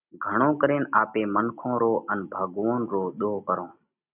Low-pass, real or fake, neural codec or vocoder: 3.6 kHz; real; none